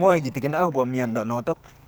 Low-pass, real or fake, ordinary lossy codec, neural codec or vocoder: none; fake; none; codec, 44.1 kHz, 2.6 kbps, SNAC